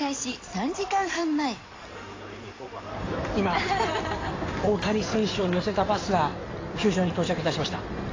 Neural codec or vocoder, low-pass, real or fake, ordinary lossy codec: codec, 16 kHz in and 24 kHz out, 2.2 kbps, FireRedTTS-2 codec; 7.2 kHz; fake; AAC, 32 kbps